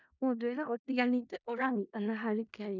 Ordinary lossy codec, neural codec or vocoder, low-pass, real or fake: none; codec, 16 kHz in and 24 kHz out, 0.4 kbps, LongCat-Audio-Codec, four codebook decoder; 7.2 kHz; fake